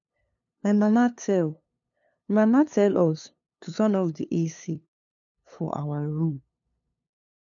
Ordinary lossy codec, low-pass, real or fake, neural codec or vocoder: none; 7.2 kHz; fake; codec, 16 kHz, 2 kbps, FunCodec, trained on LibriTTS, 25 frames a second